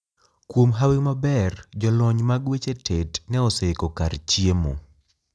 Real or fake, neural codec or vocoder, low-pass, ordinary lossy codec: real; none; none; none